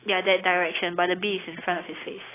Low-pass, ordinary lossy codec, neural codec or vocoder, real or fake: 3.6 kHz; AAC, 16 kbps; none; real